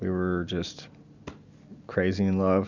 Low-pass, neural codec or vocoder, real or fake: 7.2 kHz; none; real